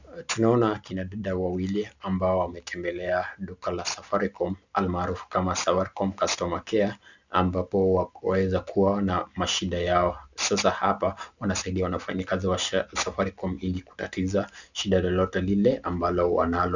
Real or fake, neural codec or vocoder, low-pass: real; none; 7.2 kHz